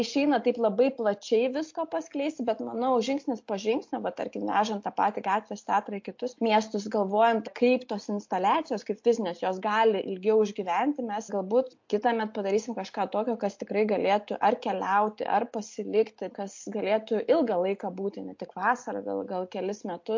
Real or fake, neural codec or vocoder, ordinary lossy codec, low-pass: real; none; MP3, 48 kbps; 7.2 kHz